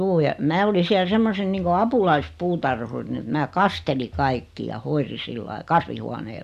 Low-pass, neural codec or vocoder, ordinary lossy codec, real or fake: 14.4 kHz; none; none; real